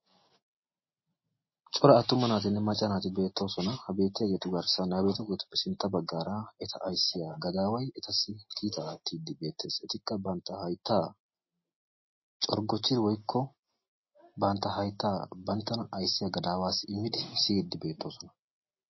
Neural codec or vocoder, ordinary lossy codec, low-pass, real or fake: none; MP3, 24 kbps; 7.2 kHz; real